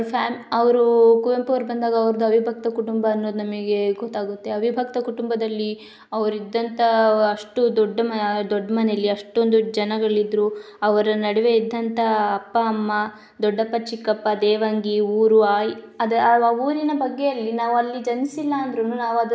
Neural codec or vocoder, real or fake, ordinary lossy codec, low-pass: none; real; none; none